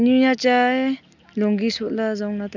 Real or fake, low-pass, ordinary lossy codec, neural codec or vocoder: real; 7.2 kHz; none; none